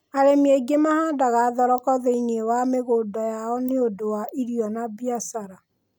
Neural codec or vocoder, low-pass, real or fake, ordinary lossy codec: none; none; real; none